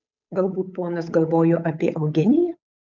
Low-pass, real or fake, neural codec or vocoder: 7.2 kHz; fake; codec, 16 kHz, 8 kbps, FunCodec, trained on Chinese and English, 25 frames a second